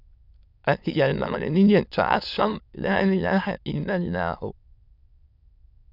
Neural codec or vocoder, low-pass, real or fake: autoencoder, 22.05 kHz, a latent of 192 numbers a frame, VITS, trained on many speakers; 5.4 kHz; fake